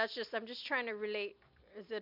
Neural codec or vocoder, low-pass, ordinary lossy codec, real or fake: none; 5.4 kHz; MP3, 48 kbps; real